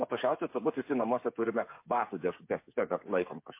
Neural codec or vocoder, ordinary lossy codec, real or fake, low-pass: codec, 16 kHz, 8 kbps, FreqCodec, smaller model; MP3, 24 kbps; fake; 3.6 kHz